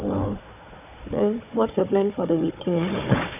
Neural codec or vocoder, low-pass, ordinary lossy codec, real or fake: codec, 16 kHz, 4 kbps, FunCodec, trained on Chinese and English, 50 frames a second; 3.6 kHz; none; fake